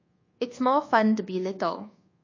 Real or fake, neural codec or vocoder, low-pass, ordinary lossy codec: fake; codec, 24 kHz, 1.2 kbps, DualCodec; 7.2 kHz; MP3, 32 kbps